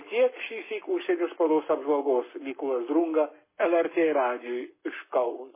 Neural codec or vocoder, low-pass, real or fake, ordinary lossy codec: codec, 44.1 kHz, 7.8 kbps, Pupu-Codec; 3.6 kHz; fake; MP3, 16 kbps